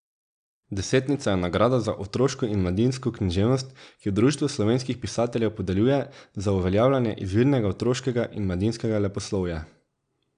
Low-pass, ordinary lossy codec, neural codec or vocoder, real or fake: 9.9 kHz; none; none; real